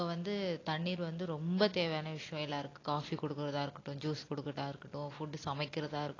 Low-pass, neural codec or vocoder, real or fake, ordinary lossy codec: 7.2 kHz; none; real; AAC, 32 kbps